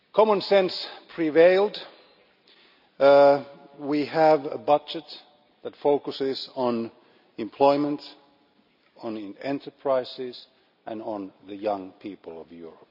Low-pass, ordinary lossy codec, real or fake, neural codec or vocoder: 5.4 kHz; none; real; none